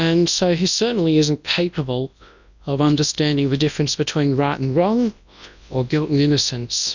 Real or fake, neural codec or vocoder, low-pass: fake; codec, 24 kHz, 0.9 kbps, WavTokenizer, large speech release; 7.2 kHz